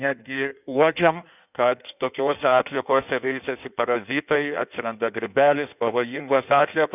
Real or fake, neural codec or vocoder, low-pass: fake; codec, 16 kHz in and 24 kHz out, 1.1 kbps, FireRedTTS-2 codec; 3.6 kHz